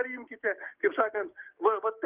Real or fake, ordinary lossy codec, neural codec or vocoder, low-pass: fake; Opus, 32 kbps; codec, 16 kHz, 6 kbps, DAC; 3.6 kHz